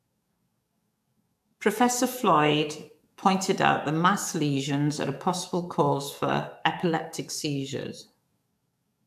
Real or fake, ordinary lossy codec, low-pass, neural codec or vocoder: fake; AAC, 96 kbps; 14.4 kHz; codec, 44.1 kHz, 7.8 kbps, DAC